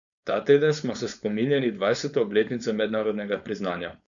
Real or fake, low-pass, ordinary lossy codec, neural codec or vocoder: fake; 7.2 kHz; none; codec, 16 kHz, 4.8 kbps, FACodec